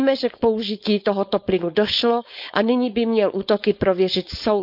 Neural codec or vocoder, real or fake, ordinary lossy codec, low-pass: codec, 16 kHz, 4.8 kbps, FACodec; fake; none; 5.4 kHz